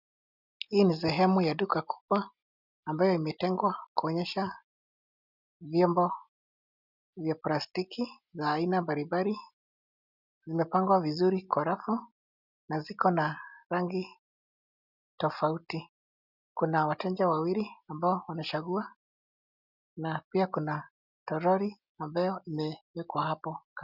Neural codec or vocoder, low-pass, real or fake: none; 5.4 kHz; real